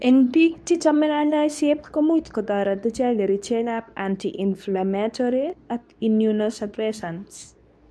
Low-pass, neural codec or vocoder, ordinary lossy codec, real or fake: none; codec, 24 kHz, 0.9 kbps, WavTokenizer, medium speech release version 2; none; fake